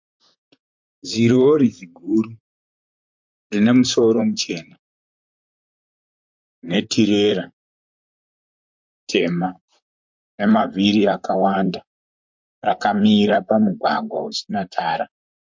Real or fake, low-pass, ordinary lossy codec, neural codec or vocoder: fake; 7.2 kHz; MP3, 48 kbps; vocoder, 44.1 kHz, 128 mel bands, Pupu-Vocoder